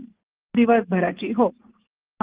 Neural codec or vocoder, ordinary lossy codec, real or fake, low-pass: none; Opus, 16 kbps; real; 3.6 kHz